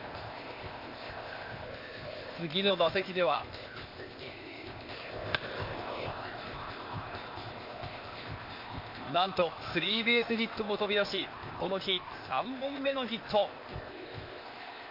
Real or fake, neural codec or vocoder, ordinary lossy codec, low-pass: fake; codec, 16 kHz, 0.8 kbps, ZipCodec; MP3, 32 kbps; 5.4 kHz